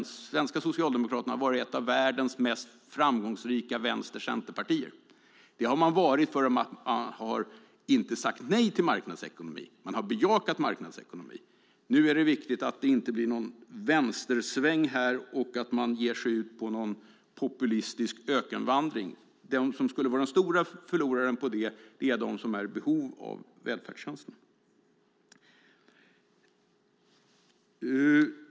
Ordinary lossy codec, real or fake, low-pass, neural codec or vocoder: none; real; none; none